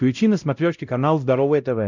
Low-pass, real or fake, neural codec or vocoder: 7.2 kHz; fake; codec, 16 kHz, 0.5 kbps, X-Codec, WavLM features, trained on Multilingual LibriSpeech